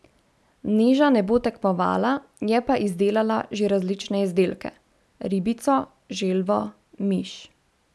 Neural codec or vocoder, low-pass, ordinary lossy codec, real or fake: none; none; none; real